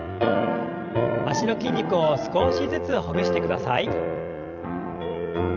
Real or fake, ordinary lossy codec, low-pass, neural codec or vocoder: fake; none; 7.2 kHz; vocoder, 22.05 kHz, 80 mel bands, Vocos